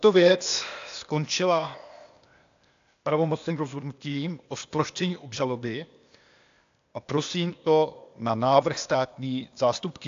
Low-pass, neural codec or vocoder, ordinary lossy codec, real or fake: 7.2 kHz; codec, 16 kHz, 0.8 kbps, ZipCodec; AAC, 64 kbps; fake